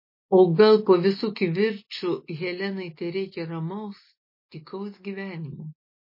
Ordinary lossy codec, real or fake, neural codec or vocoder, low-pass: MP3, 24 kbps; real; none; 5.4 kHz